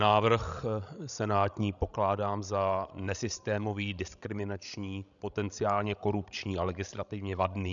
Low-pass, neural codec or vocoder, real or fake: 7.2 kHz; codec, 16 kHz, 16 kbps, FreqCodec, larger model; fake